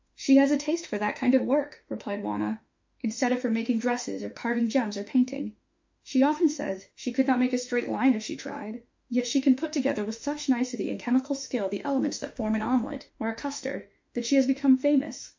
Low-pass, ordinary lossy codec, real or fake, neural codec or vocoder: 7.2 kHz; MP3, 48 kbps; fake; autoencoder, 48 kHz, 32 numbers a frame, DAC-VAE, trained on Japanese speech